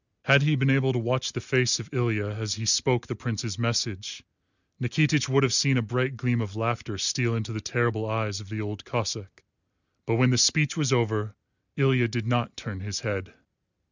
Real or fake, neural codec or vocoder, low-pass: real; none; 7.2 kHz